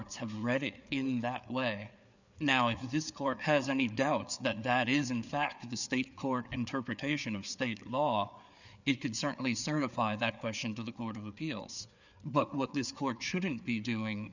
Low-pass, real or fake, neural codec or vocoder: 7.2 kHz; fake; codec, 16 kHz, 4 kbps, FreqCodec, larger model